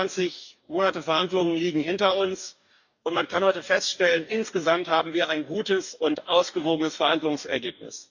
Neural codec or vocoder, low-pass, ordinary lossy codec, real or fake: codec, 44.1 kHz, 2.6 kbps, DAC; 7.2 kHz; none; fake